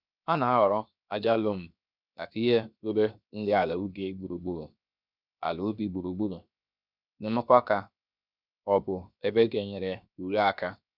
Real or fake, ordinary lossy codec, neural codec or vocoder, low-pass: fake; none; codec, 16 kHz, 0.7 kbps, FocalCodec; 5.4 kHz